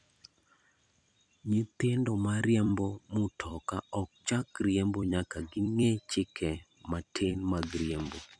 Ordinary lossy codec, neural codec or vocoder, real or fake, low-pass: none; vocoder, 44.1 kHz, 128 mel bands every 256 samples, BigVGAN v2; fake; 9.9 kHz